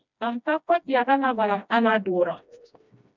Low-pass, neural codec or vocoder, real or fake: 7.2 kHz; codec, 16 kHz, 1 kbps, FreqCodec, smaller model; fake